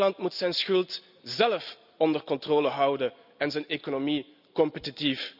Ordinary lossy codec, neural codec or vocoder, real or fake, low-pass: none; none; real; 5.4 kHz